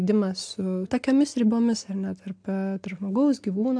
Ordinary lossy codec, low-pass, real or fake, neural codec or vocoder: AAC, 64 kbps; 9.9 kHz; real; none